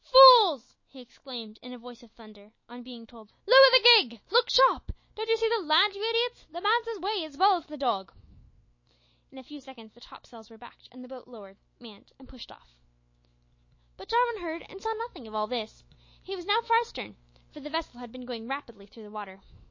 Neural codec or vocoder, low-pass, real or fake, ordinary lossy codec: autoencoder, 48 kHz, 128 numbers a frame, DAC-VAE, trained on Japanese speech; 7.2 kHz; fake; MP3, 32 kbps